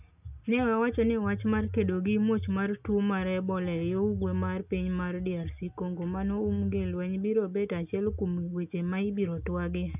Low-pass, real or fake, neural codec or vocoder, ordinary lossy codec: 3.6 kHz; real; none; none